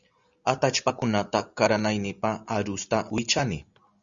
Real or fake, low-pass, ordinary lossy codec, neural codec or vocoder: real; 7.2 kHz; Opus, 64 kbps; none